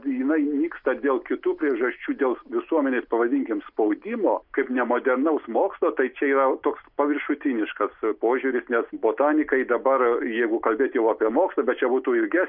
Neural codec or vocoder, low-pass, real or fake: none; 5.4 kHz; real